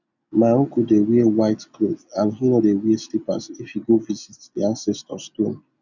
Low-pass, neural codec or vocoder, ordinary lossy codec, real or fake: 7.2 kHz; none; none; real